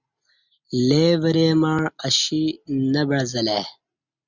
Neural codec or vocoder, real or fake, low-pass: none; real; 7.2 kHz